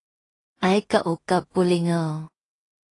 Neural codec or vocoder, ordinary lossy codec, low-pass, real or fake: codec, 16 kHz in and 24 kHz out, 0.4 kbps, LongCat-Audio-Codec, two codebook decoder; AAC, 32 kbps; 10.8 kHz; fake